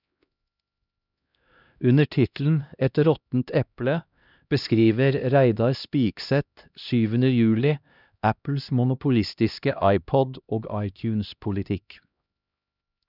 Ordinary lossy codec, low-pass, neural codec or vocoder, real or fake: none; 5.4 kHz; codec, 16 kHz, 1 kbps, X-Codec, HuBERT features, trained on LibriSpeech; fake